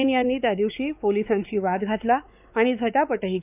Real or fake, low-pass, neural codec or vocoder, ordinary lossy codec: fake; 3.6 kHz; codec, 16 kHz, 4 kbps, X-Codec, WavLM features, trained on Multilingual LibriSpeech; none